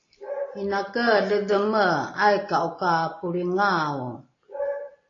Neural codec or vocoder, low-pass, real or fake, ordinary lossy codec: none; 7.2 kHz; real; AAC, 32 kbps